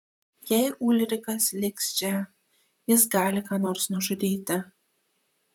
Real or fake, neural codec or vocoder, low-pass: fake; vocoder, 44.1 kHz, 128 mel bands, Pupu-Vocoder; 19.8 kHz